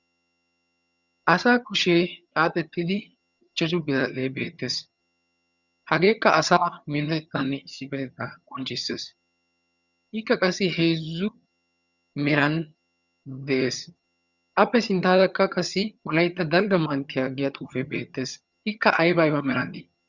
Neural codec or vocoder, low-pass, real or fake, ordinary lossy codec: vocoder, 22.05 kHz, 80 mel bands, HiFi-GAN; 7.2 kHz; fake; Opus, 64 kbps